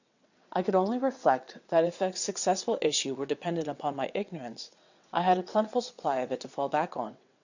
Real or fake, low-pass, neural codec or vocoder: fake; 7.2 kHz; vocoder, 22.05 kHz, 80 mel bands, WaveNeXt